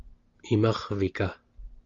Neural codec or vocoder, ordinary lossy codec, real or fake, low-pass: none; Opus, 32 kbps; real; 7.2 kHz